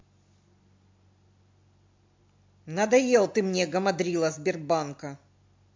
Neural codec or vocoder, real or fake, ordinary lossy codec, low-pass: none; real; MP3, 48 kbps; 7.2 kHz